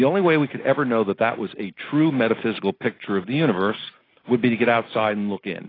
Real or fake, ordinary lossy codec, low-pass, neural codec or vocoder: real; AAC, 24 kbps; 5.4 kHz; none